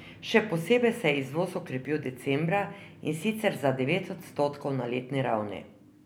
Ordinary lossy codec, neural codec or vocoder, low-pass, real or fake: none; none; none; real